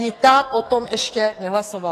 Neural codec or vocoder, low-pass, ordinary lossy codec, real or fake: codec, 44.1 kHz, 2.6 kbps, SNAC; 14.4 kHz; AAC, 48 kbps; fake